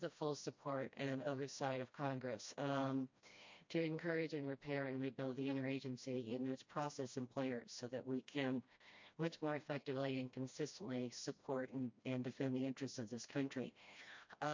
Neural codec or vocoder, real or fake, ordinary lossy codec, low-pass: codec, 16 kHz, 1 kbps, FreqCodec, smaller model; fake; MP3, 48 kbps; 7.2 kHz